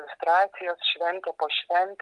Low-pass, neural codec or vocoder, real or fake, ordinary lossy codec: 10.8 kHz; none; real; Opus, 32 kbps